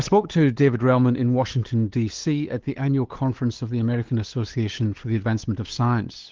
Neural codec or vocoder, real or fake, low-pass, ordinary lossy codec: codec, 16 kHz, 8 kbps, FunCodec, trained on LibriTTS, 25 frames a second; fake; 7.2 kHz; Opus, 16 kbps